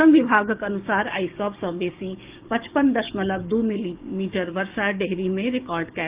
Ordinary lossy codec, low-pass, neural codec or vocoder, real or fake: Opus, 24 kbps; 3.6 kHz; codec, 16 kHz, 6 kbps, DAC; fake